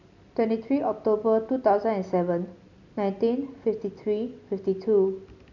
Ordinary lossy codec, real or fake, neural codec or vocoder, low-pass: none; real; none; 7.2 kHz